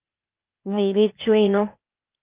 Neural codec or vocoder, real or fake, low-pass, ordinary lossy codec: codec, 16 kHz, 0.8 kbps, ZipCodec; fake; 3.6 kHz; Opus, 32 kbps